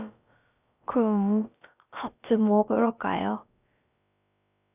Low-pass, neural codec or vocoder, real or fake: 3.6 kHz; codec, 16 kHz, about 1 kbps, DyCAST, with the encoder's durations; fake